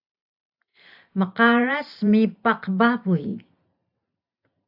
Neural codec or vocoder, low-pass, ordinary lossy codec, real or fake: vocoder, 44.1 kHz, 128 mel bands every 512 samples, BigVGAN v2; 5.4 kHz; AAC, 48 kbps; fake